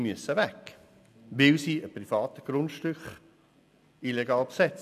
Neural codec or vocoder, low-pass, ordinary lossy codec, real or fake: none; 14.4 kHz; none; real